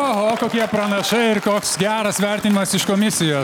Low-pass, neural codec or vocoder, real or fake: 19.8 kHz; none; real